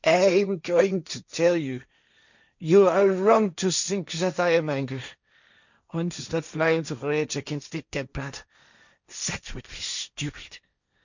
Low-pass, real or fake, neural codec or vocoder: 7.2 kHz; fake; codec, 16 kHz, 1.1 kbps, Voila-Tokenizer